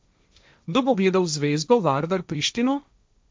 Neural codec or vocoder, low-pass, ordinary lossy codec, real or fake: codec, 16 kHz, 1.1 kbps, Voila-Tokenizer; none; none; fake